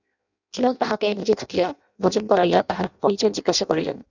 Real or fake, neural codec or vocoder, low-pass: fake; codec, 16 kHz in and 24 kHz out, 0.6 kbps, FireRedTTS-2 codec; 7.2 kHz